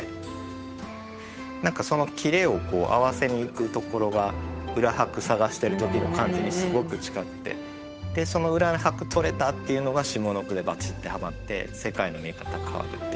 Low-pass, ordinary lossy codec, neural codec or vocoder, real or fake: none; none; codec, 16 kHz, 8 kbps, FunCodec, trained on Chinese and English, 25 frames a second; fake